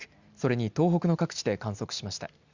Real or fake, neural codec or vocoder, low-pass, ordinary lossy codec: real; none; 7.2 kHz; Opus, 64 kbps